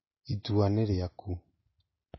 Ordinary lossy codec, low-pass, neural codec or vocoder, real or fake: MP3, 24 kbps; 7.2 kHz; none; real